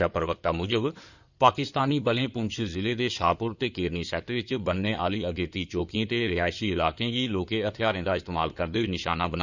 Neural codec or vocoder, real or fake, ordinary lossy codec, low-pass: codec, 16 kHz in and 24 kHz out, 2.2 kbps, FireRedTTS-2 codec; fake; none; 7.2 kHz